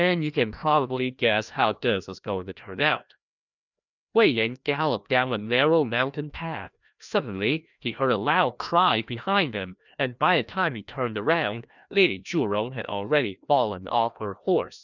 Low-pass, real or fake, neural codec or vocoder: 7.2 kHz; fake; codec, 16 kHz, 1 kbps, FreqCodec, larger model